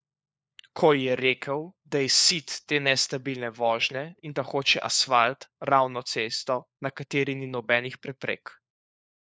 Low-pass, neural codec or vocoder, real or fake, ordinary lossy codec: none; codec, 16 kHz, 4 kbps, FunCodec, trained on LibriTTS, 50 frames a second; fake; none